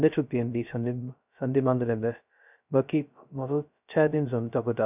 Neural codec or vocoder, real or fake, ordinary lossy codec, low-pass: codec, 16 kHz, 0.2 kbps, FocalCodec; fake; none; 3.6 kHz